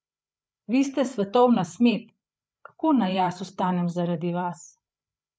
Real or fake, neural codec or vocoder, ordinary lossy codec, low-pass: fake; codec, 16 kHz, 8 kbps, FreqCodec, larger model; none; none